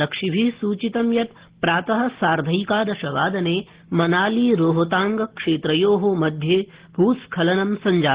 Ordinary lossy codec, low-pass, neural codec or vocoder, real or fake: Opus, 16 kbps; 3.6 kHz; none; real